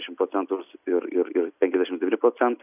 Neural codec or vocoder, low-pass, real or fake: none; 3.6 kHz; real